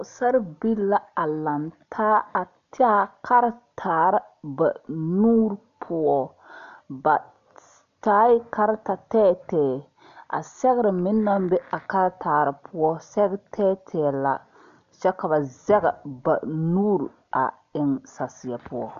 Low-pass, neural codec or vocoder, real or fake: 7.2 kHz; none; real